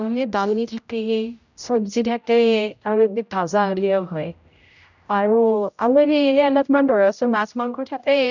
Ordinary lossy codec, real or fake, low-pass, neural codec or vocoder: none; fake; 7.2 kHz; codec, 16 kHz, 0.5 kbps, X-Codec, HuBERT features, trained on general audio